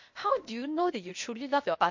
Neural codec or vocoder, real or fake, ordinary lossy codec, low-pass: codec, 16 kHz, 0.8 kbps, ZipCodec; fake; MP3, 48 kbps; 7.2 kHz